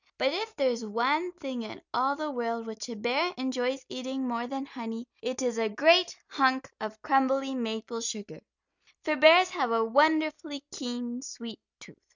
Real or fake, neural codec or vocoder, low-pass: real; none; 7.2 kHz